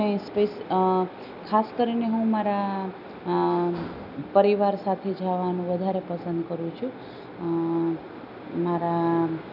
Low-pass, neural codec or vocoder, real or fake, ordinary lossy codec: 5.4 kHz; none; real; none